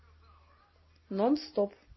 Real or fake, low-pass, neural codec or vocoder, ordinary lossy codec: real; 7.2 kHz; none; MP3, 24 kbps